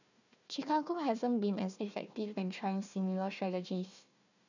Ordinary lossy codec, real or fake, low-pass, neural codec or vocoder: none; fake; 7.2 kHz; codec, 16 kHz, 1 kbps, FunCodec, trained on Chinese and English, 50 frames a second